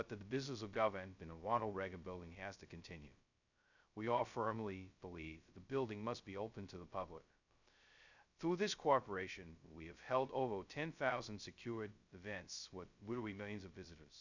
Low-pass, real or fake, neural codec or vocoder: 7.2 kHz; fake; codec, 16 kHz, 0.2 kbps, FocalCodec